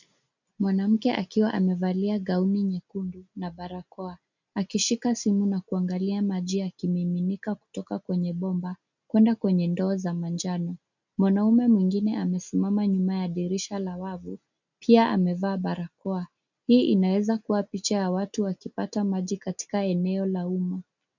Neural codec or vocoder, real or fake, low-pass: none; real; 7.2 kHz